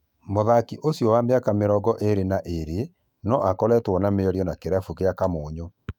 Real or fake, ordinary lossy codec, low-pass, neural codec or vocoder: fake; none; 19.8 kHz; autoencoder, 48 kHz, 128 numbers a frame, DAC-VAE, trained on Japanese speech